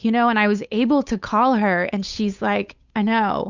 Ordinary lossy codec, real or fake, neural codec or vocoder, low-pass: Opus, 64 kbps; fake; codec, 16 kHz, 8 kbps, FunCodec, trained on Chinese and English, 25 frames a second; 7.2 kHz